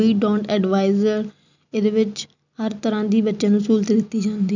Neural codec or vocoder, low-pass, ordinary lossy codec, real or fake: none; 7.2 kHz; none; real